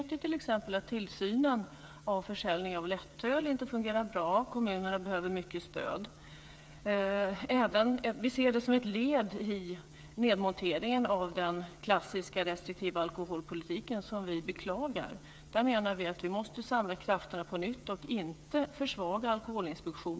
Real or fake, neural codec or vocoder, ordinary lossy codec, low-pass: fake; codec, 16 kHz, 8 kbps, FreqCodec, smaller model; none; none